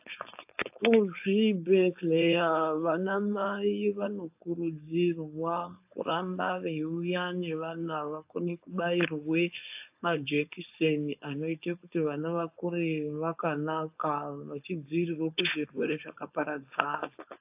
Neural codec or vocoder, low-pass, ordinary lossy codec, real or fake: codec, 16 kHz, 4.8 kbps, FACodec; 3.6 kHz; AAC, 32 kbps; fake